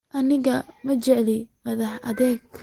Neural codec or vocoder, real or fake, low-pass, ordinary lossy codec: none; real; 19.8 kHz; Opus, 16 kbps